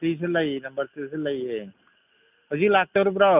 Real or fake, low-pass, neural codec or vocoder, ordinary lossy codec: real; 3.6 kHz; none; none